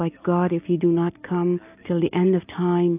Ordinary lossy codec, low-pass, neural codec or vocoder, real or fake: AAC, 32 kbps; 3.6 kHz; none; real